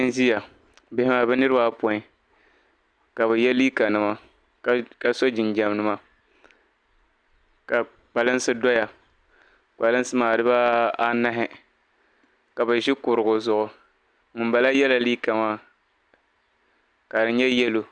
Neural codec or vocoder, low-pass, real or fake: none; 9.9 kHz; real